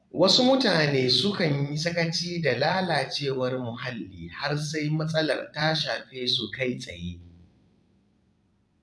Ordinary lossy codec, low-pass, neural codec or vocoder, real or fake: none; none; vocoder, 22.05 kHz, 80 mel bands, Vocos; fake